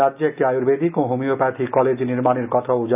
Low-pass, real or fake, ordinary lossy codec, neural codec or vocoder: 3.6 kHz; real; none; none